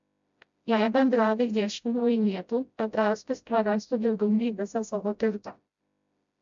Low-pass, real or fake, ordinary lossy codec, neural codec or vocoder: 7.2 kHz; fake; MP3, 64 kbps; codec, 16 kHz, 0.5 kbps, FreqCodec, smaller model